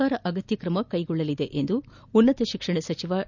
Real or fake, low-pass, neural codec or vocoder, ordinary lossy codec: real; 7.2 kHz; none; none